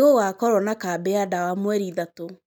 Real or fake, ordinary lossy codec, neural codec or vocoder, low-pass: real; none; none; none